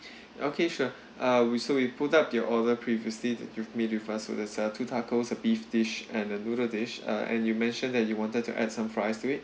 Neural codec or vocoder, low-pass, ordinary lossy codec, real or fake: none; none; none; real